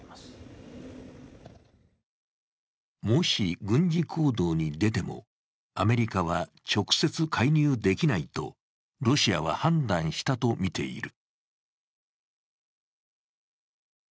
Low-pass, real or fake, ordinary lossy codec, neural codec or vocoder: none; real; none; none